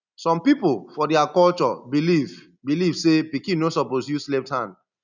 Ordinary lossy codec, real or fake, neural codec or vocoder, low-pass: none; real; none; 7.2 kHz